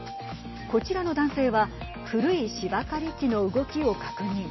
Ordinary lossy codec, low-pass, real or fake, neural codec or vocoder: MP3, 24 kbps; 7.2 kHz; real; none